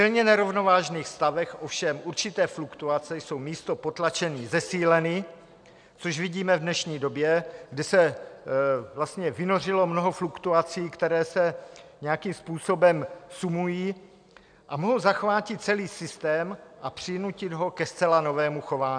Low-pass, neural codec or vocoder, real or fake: 9.9 kHz; none; real